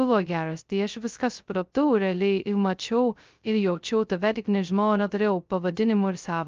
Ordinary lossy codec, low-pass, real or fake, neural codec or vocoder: Opus, 24 kbps; 7.2 kHz; fake; codec, 16 kHz, 0.2 kbps, FocalCodec